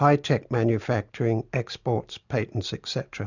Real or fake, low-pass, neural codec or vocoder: real; 7.2 kHz; none